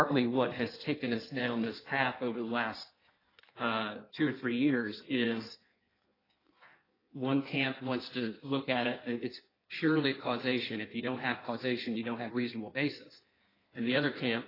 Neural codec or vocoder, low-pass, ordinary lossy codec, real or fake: codec, 16 kHz in and 24 kHz out, 1.1 kbps, FireRedTTS-2 codec; 5.4 kHz; AAC, 24 kbps; fake